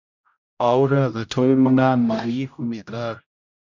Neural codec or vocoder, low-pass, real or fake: codec, 16 kHz, 0.5 kbps, X-Codec, HuBERT features, trained on general audio; 7.2 kHz; fake